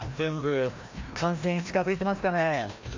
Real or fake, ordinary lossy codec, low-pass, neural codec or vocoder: fake; MP3, 48 kbps; 7.2 kHz; codec, 16 kHz, 1 kbps, FreqCodec, larger model